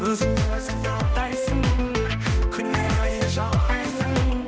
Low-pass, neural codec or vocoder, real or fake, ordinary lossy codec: none; codec, 16 kHz, 1 kbps, X-Codec, HuBERT features, trained on balanced general audio; fake; none